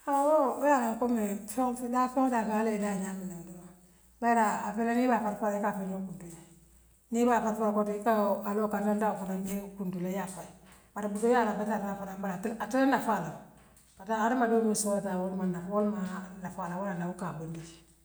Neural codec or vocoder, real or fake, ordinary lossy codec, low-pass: none; real; none; none